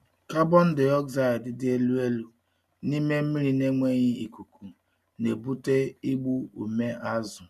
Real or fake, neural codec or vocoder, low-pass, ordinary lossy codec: real; none; 14.4 kHz; none